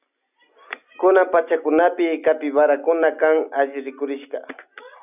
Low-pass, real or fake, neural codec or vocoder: 3.6 kHz; real; none